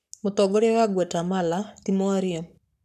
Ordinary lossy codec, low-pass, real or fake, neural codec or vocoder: none; 14.4 kHz; fake; codec, 44.1 kHz, 7.8 kbps, Pupu-Codec